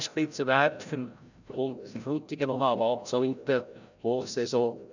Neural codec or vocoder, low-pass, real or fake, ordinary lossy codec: codec, 16 kHz, 0.5 kbps, FreqCodec, larger model; 7.2 kHz; fake; none